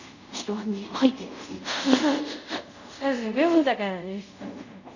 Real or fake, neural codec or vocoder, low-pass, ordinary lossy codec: fake; codec, 24 kHz, 0.5 kbps, DualCodec; 7.2 kHz; none